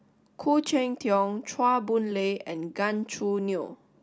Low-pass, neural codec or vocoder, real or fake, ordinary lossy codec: none; none; real; none